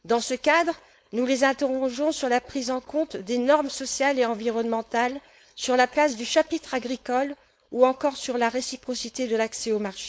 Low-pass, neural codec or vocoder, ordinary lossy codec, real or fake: none; codec, 16 kHz, 4.8 kbps, FACodec; none; fake